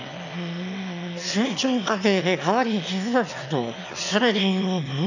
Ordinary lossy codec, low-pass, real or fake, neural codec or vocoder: none; 7.2 kHz; fake; autoencoder, 22.05 kHz, a latent of 192 numbers a frame, VITS, trained on one speaker